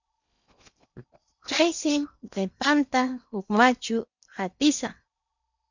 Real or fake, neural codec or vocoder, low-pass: fake; codec, 16 kHz in and 24 kHz out, 0.8 kbps, FocalCodec, streaming, 65536 codes; 7.2 kHz